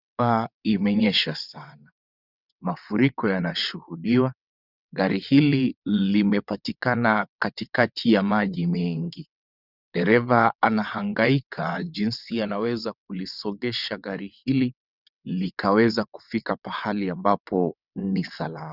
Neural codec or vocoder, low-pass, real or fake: vocoder, 22.05 kHz, 80 mel bands, WaveNeXt; 5.4 kHz; fake